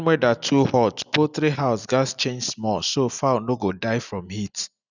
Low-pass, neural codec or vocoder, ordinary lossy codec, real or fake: 7.2 kHz; none; none; real